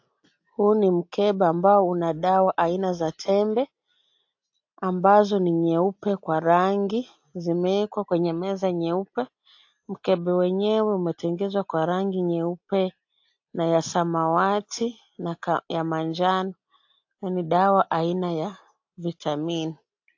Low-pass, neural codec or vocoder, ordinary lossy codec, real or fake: 7.2 kHz; none; AAC, 48 kbps; real